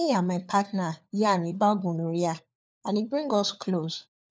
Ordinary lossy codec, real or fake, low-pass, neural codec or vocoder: none; fake; none; codec, 16 kHz, 4 kbps, FunCodec, trained on LibriTTS, 50 frames a second